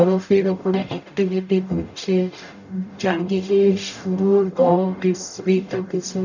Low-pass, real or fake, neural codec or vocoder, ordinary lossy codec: 7.2 kHz; fake; codec, 44.1 kHz, 0.9 kbps, DAC; none